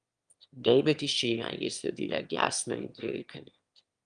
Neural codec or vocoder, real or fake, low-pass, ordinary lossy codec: autoencoder, 22.05 kHz, a latent of 192 numbers a frame, VITS, trained on one speaker; fake; 9.9 kHz; Opus, 32 kbps